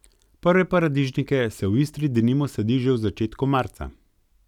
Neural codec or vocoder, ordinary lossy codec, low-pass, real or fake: none; none; 19.8 kHz; real